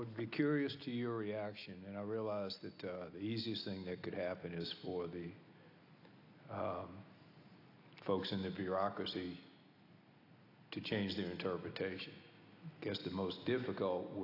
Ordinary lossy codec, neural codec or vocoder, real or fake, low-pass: AAC, 32 kbps; none; real; 5.4 kHz